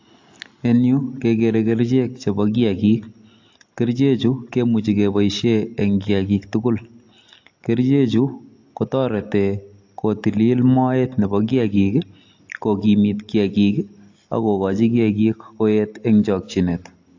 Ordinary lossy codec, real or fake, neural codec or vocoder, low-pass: AAC, 48 kbps; real; none; 7.2 kHz